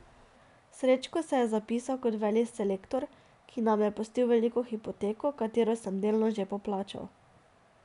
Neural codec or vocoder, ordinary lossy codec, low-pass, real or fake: none; none; 10.8 kHz; real